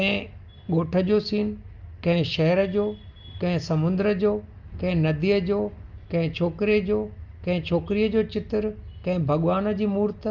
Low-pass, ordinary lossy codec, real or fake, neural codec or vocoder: 7.2 kHz; Opus, 24 kbps; real; none